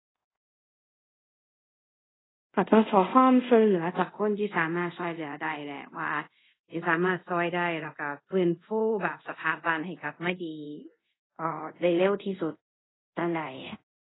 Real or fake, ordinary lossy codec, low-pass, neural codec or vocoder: fake; AAC, 16 kbps; 7.2 kHz; codec, 24 kHz, 0.5 kbps, DualCodec